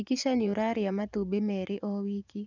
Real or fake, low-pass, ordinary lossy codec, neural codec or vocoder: fake; 7.2 kHz; none; vocoder, 24 kHz, 100 mel bands, Vocos